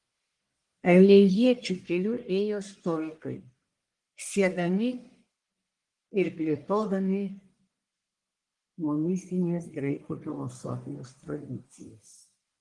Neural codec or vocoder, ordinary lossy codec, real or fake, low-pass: codec, 44.1 kHz, 1.7 kbps, Pupu-Codec; Opus, 24 kbps; fake; 10.8 kHz